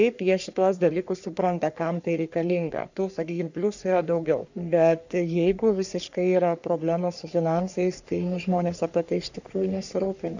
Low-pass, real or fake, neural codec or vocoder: 7.2 kHz; fake; codec, 44.1 kHz, 3.4 kbps, Pupu-Codec